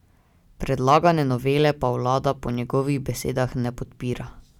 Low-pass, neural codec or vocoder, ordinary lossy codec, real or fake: 19.8 kHz; none; none; real